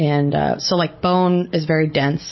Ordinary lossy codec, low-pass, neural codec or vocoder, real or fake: MP3, 24 kbps; 7.2 kHz; none; real